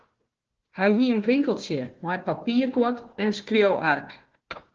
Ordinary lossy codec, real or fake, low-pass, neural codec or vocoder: Opus, 16 kbps; fake; 7.2 kHz; codec, 16 kHz, 1 kbps, FunCodec, trained on Chinese and English, 50 frames a second